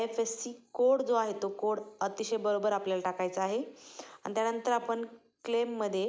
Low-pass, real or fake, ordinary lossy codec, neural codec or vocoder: none; real; none; none